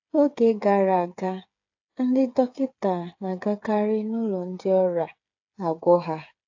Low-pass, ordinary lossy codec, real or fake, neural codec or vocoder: 7.2 kHz; AAC, 48 kbps; fake; codec, 16 kHz, 8 kbps, FreqCodec, smaller model